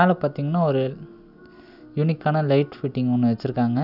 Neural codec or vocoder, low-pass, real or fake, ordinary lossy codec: none; 5.4 kHz; real; none